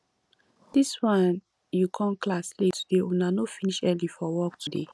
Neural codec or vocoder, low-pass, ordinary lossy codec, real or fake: none; none; none; real